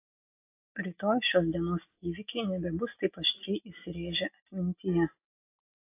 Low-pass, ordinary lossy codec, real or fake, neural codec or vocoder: 3.6 kHz; AAC, 24 kbps; real; none